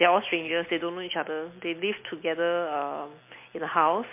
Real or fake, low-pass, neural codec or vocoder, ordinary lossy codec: real; 3.6 kHz; none; MP3, 24 kbps